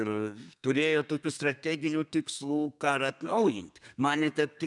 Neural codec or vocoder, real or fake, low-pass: codec, 32 kHz, 1.9 kbps, SNAC; fake; 10.8 kHz